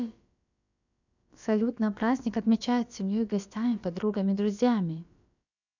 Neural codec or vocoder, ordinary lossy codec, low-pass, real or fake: codec, 16 kHz, about 1 kbps, DyCAST, with the encoder's durations; none; 7.2 kHz; fake